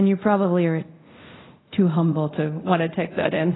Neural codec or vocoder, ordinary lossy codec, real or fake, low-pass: codec, 24 kHz, 0.5 kbps, DualCodec; AAC, 16 kbps; fake; 7.2 kHz